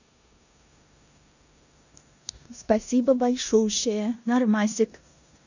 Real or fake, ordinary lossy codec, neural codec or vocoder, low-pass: fake; none; codec, 16 kHz in and 24 kHz out, 0.9 kbps, LongCat-Audio-Codec, four codebook decoder; 7.2 kHz